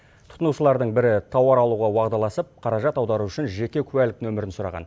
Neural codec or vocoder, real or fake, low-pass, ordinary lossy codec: none; real; none; none